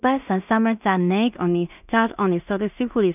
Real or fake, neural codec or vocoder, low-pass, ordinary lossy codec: fake; codec, 16 kHz in and 24 kHz out, 0.4 kbps, LongCat-Audio-Codec, two codebook decoder; 3.6 kHz; none